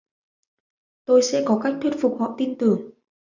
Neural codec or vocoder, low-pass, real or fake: none; 7.2 kHz; real